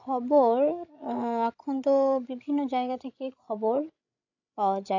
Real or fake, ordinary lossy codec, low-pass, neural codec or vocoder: fake; AAC, 48 kbps; 7.2 kHz; codec, 16 kHz, 16 kbps, FreqCodec, larger model